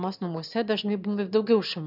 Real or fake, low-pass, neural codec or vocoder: fake; 5.4 kHz; autoencoder, 22.05 kHz, a latent of 192 numbers a frame, VITS, trained on one speaker